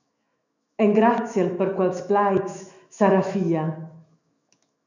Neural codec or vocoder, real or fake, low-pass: autoencoder, 48 kHz, 128 numbers a frame, DAC-VAE, trained on Japanese speech; fake; 7.2 kHz